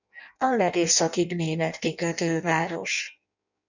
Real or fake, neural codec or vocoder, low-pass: fake; codec, 16 kHz in and 24 kHz out, 0.6 kbps, FireRedTTS-2 codec; 7.2 kHz